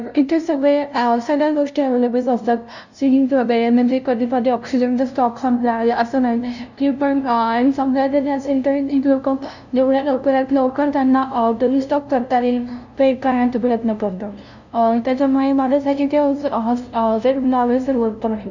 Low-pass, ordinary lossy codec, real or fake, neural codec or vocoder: 7.2 kHz; none; fake; codec, 16 kHz, 0.5 kbps, FunCodec, trained on LibriTTS, 25 frames a second